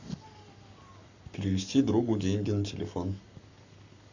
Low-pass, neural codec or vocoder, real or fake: 7.2 kHz; codec, 44.1 kHz, 7.8 kbps, Pupu-Codec; fake